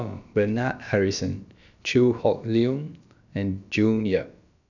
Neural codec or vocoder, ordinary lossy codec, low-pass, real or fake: codec, 16 kHz, about 1 kbps, DyCAST, with the encoder's durations; none; 7.2 kHz; fake